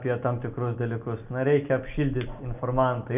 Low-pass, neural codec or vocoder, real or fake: 3.6 kHz; none; real